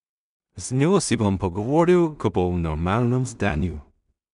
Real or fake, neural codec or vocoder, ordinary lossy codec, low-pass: fake; codec, 16 kHz in and 24 kHz out, 0.4 kbps, LongCat-Audio-Codec, two codebook decoder; none; 10.8 kHz